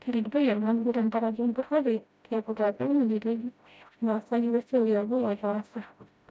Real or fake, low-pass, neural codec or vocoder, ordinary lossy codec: fake; none; codec, 16 kHz, 0.5 kbps, FreqCodec, smaller model; none